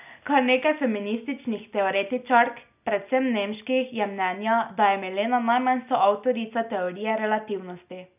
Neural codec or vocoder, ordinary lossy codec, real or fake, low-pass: none; none; real; 3.6 kHz